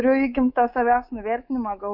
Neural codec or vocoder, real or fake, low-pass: codec, 24 kHz, 3.1 kbps, DualCodec; fake; 5.4 kHz